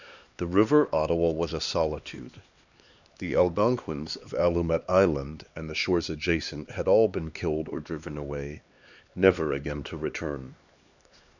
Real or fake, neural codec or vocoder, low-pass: fake; codec, 16 kHz, 2 kbps, X-Codec, HuBERT features, trained on LibriSpeech; 7.2 kHz